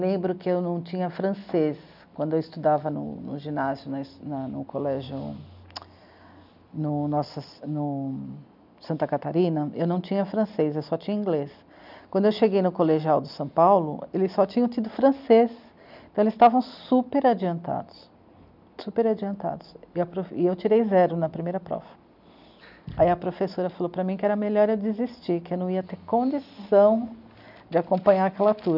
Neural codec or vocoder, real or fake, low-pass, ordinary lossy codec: none; real; 5.4 kHz; none